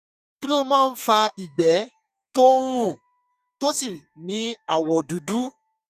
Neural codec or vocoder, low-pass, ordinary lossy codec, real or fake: codec, 32 kHz, 1.9 kbps, SNAC; 14.4 kHz; none; fake